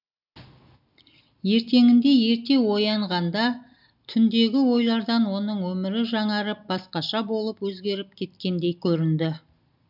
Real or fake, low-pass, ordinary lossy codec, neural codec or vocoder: real; 5.4 kHz; none; none